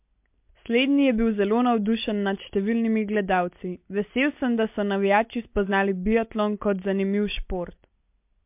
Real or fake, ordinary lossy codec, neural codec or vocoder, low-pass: real; MP3, 32 kbps; none; 3.6 kHz